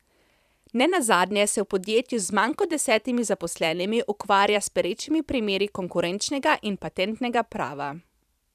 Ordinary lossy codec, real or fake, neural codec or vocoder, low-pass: none; fake; vocoder, 44.1 kHz, 128 mel bands every 512 samples, BigVGAN v2; 14.4 kHz